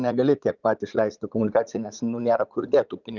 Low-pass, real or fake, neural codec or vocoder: 7.2 kHz; fake; vocoder, 44.1 kHz, 80 mel bands, Vocos